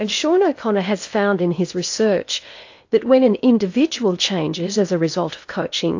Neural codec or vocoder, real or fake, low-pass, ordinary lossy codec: codec, 16 kHz in and 24 kHz out, 0.8 kbps, FocalCodec, streaming, 65536 codes; fake; 7.2 kHz; AAC, 48 kbps